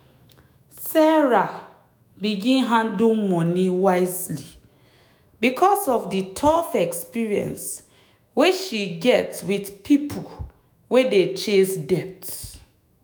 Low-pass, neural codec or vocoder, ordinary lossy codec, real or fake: none; autoencoder, 48 kHz, 128 numbers a frame, DAC-VAE, trained on Japanese speech; none; fake